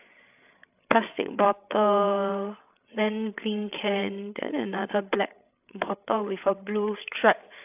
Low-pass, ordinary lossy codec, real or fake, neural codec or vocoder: 3.6 kHz; AAC, 32 kbps; fake; codec, 16 kHz, 8 kbps, FreqCodec, larger model